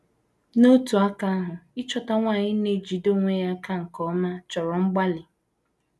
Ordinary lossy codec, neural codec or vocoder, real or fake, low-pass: none; none; real; none